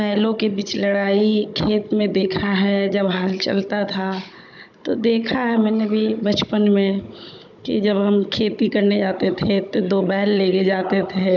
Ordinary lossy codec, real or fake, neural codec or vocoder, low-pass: none; fake; codec, 16 kHz, 16 kbps, FunCodec, trained on Chinese and English, 50 frames a second; 7.2 kHz